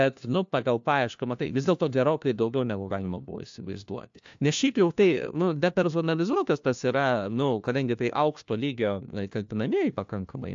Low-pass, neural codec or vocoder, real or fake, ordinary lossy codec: 7.2 kHz; codec, 16 kHz, 1 kbps, FunCodec, trained on LibriTTS, 50 frames a second; fake; AAC, 64 kbps